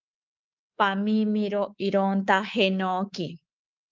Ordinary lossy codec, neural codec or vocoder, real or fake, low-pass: Opus, 32 kbps; codec, 24 kHz, 3.1 kbps, DualCodec; fake; 7.2 kHz